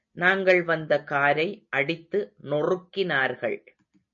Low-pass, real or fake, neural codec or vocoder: 7.2 kHz; real; none